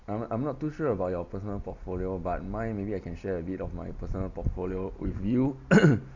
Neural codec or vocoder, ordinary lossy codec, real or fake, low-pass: none; none; real; 7.2 kHz